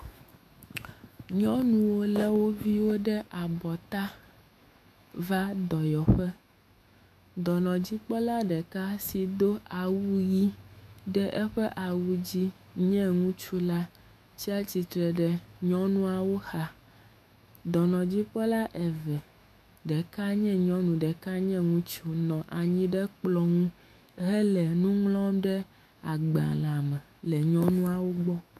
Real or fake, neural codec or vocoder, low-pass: fake; autoencoder, 48 kHz, 128 numbers a frame, DAC-VAE, trained on Japanese speech; 14.4 kHz